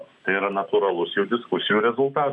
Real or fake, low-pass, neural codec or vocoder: real; 10.8 kHz; none